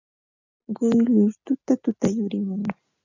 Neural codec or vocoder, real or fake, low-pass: none; real; 7.2 kHz